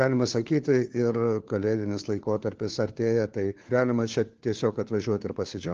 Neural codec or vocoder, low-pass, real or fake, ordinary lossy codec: codec, 16 kHz, 4 kbps, FunCodec, trained on LibriTTS, 50 frames a second; 7.2 kHz; fake; Opus, 16 kbps